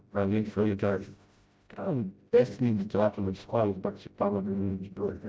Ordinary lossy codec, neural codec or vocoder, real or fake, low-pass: none; codec, 16 kHz, 0.5 kbps, FreqCodec, smaller model; fake; none